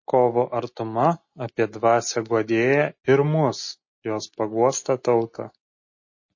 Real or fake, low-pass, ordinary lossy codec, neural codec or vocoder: real; 7.2 kHz; MP3, 32 kbps; none